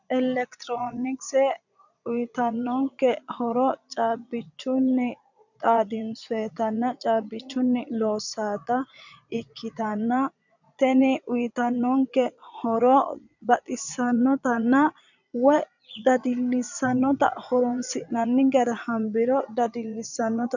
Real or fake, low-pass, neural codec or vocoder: fake; 7.2 kHz; vocoder, 22.05 kHz, 80 mel bands, Vocos